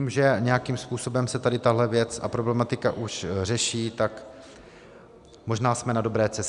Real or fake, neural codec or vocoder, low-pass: real; none; 10.8 kHz